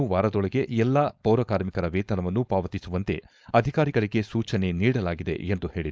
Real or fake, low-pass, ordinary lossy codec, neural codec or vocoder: fake; none; none; codec, 16 kHz, 4.8 kbps, FACodec